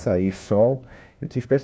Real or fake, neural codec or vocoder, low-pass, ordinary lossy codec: fake; codec, 16 kHz, 1 kbps, FunCodec, trained on LibriTTS, 50 frames a second; none; none